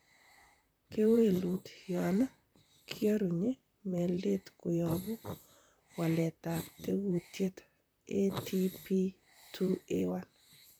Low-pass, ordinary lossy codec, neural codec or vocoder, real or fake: none; none; vocoder, 44.1 kHz, 128 mel bands, Pupu-Vocoder; fake